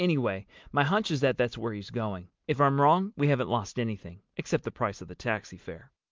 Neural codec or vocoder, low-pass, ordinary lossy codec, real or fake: none; 7.2 kHz; Opus, 32 kbps; real